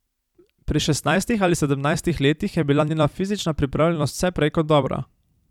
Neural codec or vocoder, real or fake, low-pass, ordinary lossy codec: vocoder, 44.1 kHz, 128 mel bands every 256 samples, BigVGAN v2; fake; 19.8 kHz; none